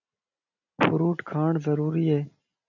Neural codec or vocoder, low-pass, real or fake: none; 7.2 kHz; real